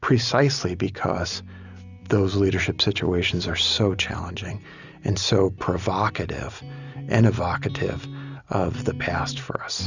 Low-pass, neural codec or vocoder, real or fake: 7.2 kHz; none; real